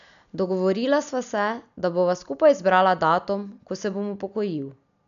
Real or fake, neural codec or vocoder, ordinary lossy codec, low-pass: real; none; none; 7.2 kHz